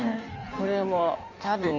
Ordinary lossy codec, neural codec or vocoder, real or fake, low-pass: MP3, 64 kbps; codec, 16 kHz in and 24 kHz out, 1.1 kbps, FireRedTTS-2 codec; fake; 7.2 kHz